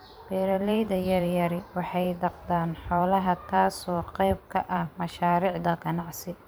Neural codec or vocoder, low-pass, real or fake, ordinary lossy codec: vocoder, 44.1 kHz, 128 mel bands every 512 samples, BigVGAN v2; none; fake; none